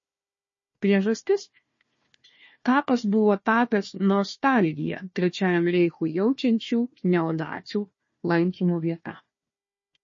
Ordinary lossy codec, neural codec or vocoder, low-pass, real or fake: MP3, 32 kbps; codec, 16 kHz, 1 kbps, FunCodec, trained on Chinese and English, 50 frames a second; 7.2 kHz; fake